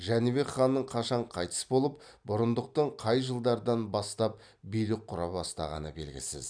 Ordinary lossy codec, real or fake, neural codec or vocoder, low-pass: none; real; none; 9.9 kHz